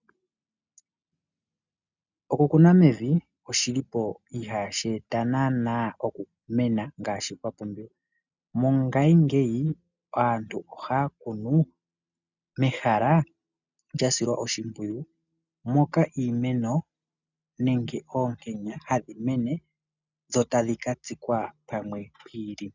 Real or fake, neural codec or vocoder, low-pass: real; none; 7.2 kHz